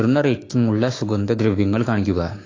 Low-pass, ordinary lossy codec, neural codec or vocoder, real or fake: 7.2 kHz; AAC, 32 kbps; codec, 16 kHz, 6 kbps, DAC; fake